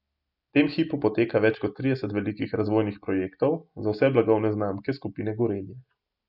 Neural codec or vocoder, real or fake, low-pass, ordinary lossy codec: none; real; 5.4 kHz; none